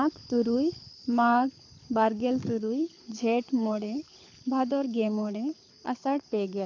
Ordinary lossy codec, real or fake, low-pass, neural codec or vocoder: AAC, 48 kbps; fake; 7.2 kHz; codec, 24 kHz, 6 kbps, HILCodec